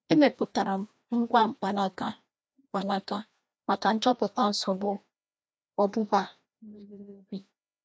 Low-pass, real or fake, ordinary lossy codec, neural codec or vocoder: none; fake; none; codec, 16 kHz, 1 kbps, FreqCodec, larger model